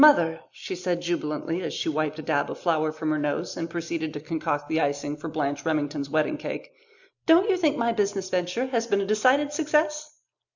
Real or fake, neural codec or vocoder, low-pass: real; none; 7.2 kHz